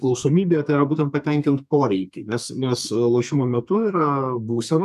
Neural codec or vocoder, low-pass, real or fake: codec, 32 kHz, 1.9 kbps, SNAC; 14.4 kHz; fake